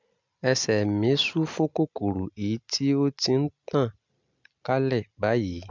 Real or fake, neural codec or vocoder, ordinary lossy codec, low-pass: real; none; MP3, 64 kbps; 7.2 kHz